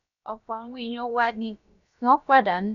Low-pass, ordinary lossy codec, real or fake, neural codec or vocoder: 7.2 kHz; none; fake; codec, 16 kHz, about 1 kbps, DyCAST, with the encoder's durations